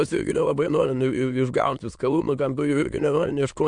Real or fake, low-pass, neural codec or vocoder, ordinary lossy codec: fake; 9.9 kHz; autoencoder, 22.05 kHz, a latent of 192 numbers a frame, VITS, trained on many speakers; MP3, 64 kbps